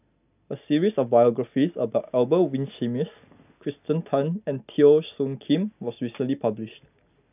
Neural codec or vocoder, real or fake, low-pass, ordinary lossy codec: none; real; 3.6 kHz; none